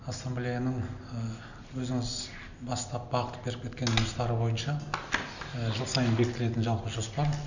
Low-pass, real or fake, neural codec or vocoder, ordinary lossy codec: 7.2 kHz; real; none; none